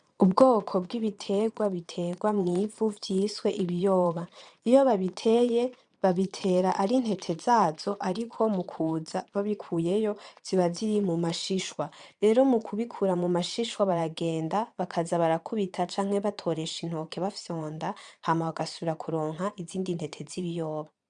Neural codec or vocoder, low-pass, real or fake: vocoder, 22.05 kHz, 80 mel bands, Vocos; 9.9 kHz; fake